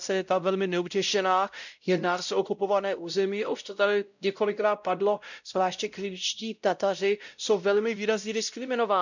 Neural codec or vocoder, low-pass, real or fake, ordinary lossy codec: codec, 16 kHz, 0.5 kbps, X-Codec, WavLM features, trained on Multilingual LibriSpeech; 7.2 kHz; fake; none